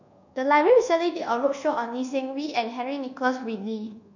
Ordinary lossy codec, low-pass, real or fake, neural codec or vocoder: none; 7.2 kHz; fake; codec, 24 kHz, 1.2 kbps, DualCodec